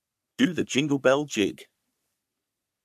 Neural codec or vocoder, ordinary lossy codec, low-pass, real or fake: codec, 44.1 kHz, 3.4 kbps, Pupu-Codec; none; 14.4 kHz; fake